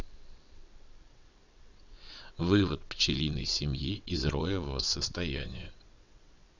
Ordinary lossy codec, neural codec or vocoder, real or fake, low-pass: none; vocoder, 22.05 kHz, 80 mel bands, WaveNeXt; fake; 7.2 kHz